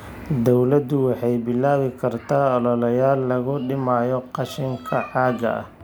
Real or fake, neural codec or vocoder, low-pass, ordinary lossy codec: real; none; none; none